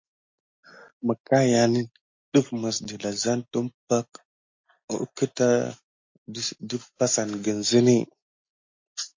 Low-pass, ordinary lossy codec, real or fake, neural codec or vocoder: 7.2 kHz; MP3, 48 kbps; real; none